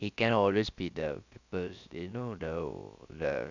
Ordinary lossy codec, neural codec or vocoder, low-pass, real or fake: none; codec, 16 kHz, about 1 kbps, DyCAST, with the encoder's durations; 7.2 kHz; fake